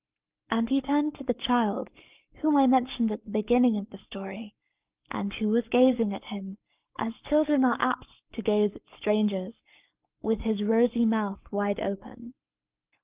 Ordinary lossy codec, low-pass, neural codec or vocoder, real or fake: Opus, 24 kbps; 3.6 kHz; codec, 44.1 kHz, 7.8 kbps, Pupu-Codec; fake